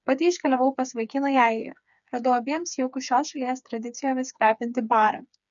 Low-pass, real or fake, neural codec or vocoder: 7.2 kHz; fake; codec, 16 kHz, 4 kbps, FreqCodec, smaller model